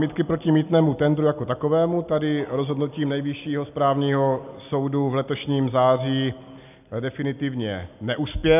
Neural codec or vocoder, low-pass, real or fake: none; 3.6 kHz; real